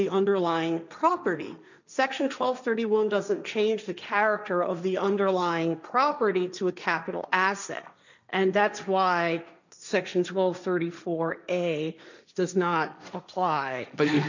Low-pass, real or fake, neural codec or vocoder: 7.2 kHz; fake; codec, 16 kHz, 1.1 kbps, Voila-Tokenizer